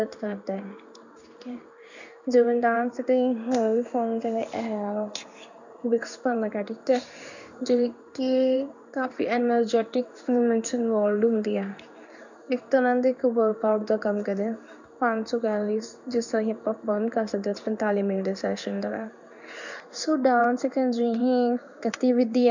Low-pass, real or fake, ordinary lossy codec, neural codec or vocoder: 7.2 kHz; fake; none; codec, 16 kHz in and 24 kHz out, 1 kbps, XY-Tokenizer